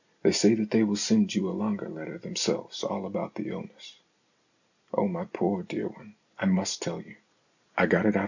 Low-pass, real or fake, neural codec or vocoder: 7.2 kHz; real; none